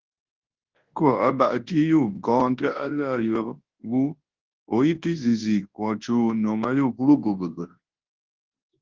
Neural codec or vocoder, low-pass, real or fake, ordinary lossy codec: codec, 24 kHz, 0.9 kbps, WavTokenizer, large speech release; 7.2 kHz; fake; Opus, 16 kbps